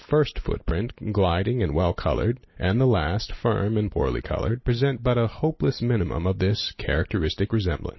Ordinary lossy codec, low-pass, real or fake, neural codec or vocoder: MP3, 24 kbps; 7.2 kHz; real; none